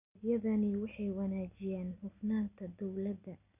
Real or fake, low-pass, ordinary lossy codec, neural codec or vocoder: real; 3.6 kHz; none; none